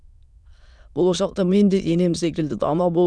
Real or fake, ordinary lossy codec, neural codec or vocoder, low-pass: fake; none; autoencoder, 22.05 kHz, a latent of 192 numbers a frame, VITS, trained on many speakers; none